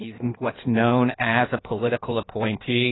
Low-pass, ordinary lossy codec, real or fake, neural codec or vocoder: 7.2 kHz; AAC, 16 kbps; fake; codec, 16 kHz in and 24 kHz out, 1.1 kbps, FireRedTTS-2 codec